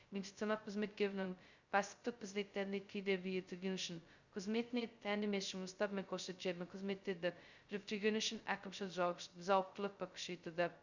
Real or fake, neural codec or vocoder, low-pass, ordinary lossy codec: fake; codec, 16 kHz, 0.2 kbps, FocalCodec; 7.2 kHz; Opus, 64 kbps